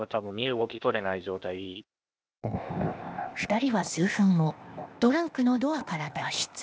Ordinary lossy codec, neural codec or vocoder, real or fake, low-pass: none; codec, 16 kHz, 0.8 kbps, ZipCodec; fake; none